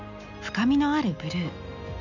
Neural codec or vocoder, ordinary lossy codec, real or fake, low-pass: none; none; real; 7.2 kHz